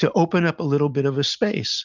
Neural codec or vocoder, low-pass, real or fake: none; 7.2 kHz; real